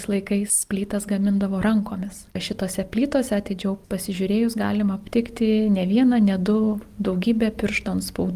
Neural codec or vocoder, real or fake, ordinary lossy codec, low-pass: none; real; Opus, 32 kbps; 14.4 kHz